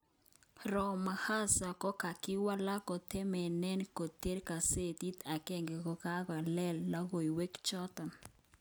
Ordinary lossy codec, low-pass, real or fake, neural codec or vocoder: none; none; real; none